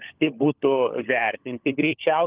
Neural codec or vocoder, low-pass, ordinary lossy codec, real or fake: codec, 16 kHz, 16 kbps, FunCodec, trained on LibriTTS, 50 frames a second; 3.6 kHz; Opus, 32 kbps; fake